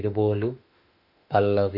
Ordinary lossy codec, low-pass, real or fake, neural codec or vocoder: none; 5.4 kHz; fake; autoencoder, 48 kHz, 32 numbers a frame, DAC-VAE, trained on Japanese speech